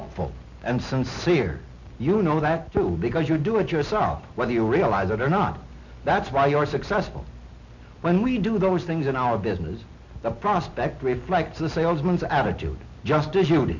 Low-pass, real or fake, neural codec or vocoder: 7.2 kHz; real; none